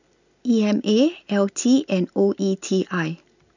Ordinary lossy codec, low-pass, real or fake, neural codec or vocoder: none; 7.2 kHz; real; none